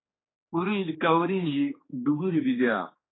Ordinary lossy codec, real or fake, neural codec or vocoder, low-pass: AAC, 16 kbps; fake; codec, 16 kHz, 2 kbps, X-Codec, HuBERT features, trained on general audio; 7.2 kHz